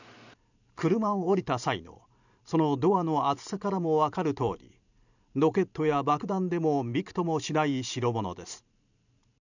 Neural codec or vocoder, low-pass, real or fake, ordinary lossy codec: vocoder, 44.1 kHz, 128 mel bands every 512 samples, BigVGAN v2; 7.2 kHz; fake; none